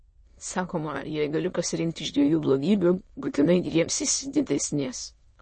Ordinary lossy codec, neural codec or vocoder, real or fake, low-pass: MP3, 32 kbps; autoencoder, 22.05 kHz, a latent of 192 numbers a frame, VITS, trained on many speakers; fake; 9.9 kHz